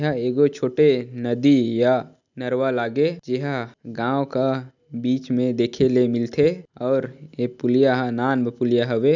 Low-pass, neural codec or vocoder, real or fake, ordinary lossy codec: 7.2 kHz; none; real; none